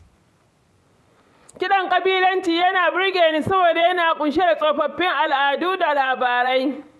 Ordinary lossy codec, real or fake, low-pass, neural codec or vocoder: none; fake; none; vocoder, 24 kHz, 100 mel bands, Vocos